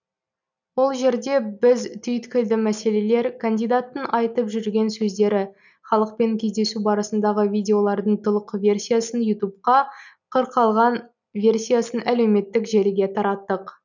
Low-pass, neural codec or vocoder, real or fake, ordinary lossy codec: 7.2 kHz; none; real; none